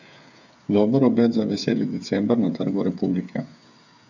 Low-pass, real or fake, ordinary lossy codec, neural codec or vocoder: 7.2 kHz; fake; none; codec, 16 kHz, 8 kbps, FreqCodec, smaller model